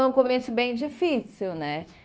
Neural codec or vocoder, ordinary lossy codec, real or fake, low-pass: codec, 16 kHz, 0.9 kbps, LongCat-Audio-Codec; none; fake; none